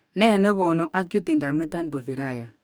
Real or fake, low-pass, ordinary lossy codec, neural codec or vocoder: fake; none; none; codec, 44.1 kHz, 2.6 kbps, DAC